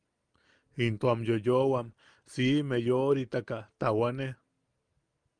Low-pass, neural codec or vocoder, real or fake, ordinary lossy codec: 9.9 kHz; none; real; Opus, 32 kbps